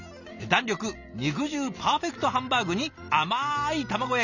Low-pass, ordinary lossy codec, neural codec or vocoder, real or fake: 7.2 kHz; none; none; real